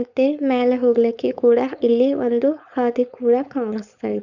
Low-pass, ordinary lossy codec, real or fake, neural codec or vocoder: 7.2 kHz; none; fake; codec, 16 kHz, 4.8 kbps, FACodec